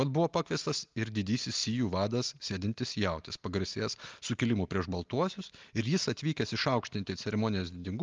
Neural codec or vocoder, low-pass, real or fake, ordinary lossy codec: none; 7.2 kHz; real; Opus, 32 kbps